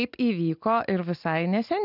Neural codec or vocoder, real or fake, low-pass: none; real; 5.4 kHz